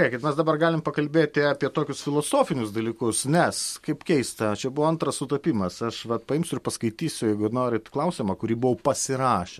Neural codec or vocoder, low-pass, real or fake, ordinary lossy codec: none; 14.4 kHz; real; MP3, 64 kbps